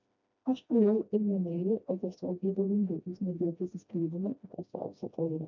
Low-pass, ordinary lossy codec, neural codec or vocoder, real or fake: 7.2 kHz; MP3, 48 kbps; codec, 16 kHz, 1 kbps, FreqCodec, smaller model; fake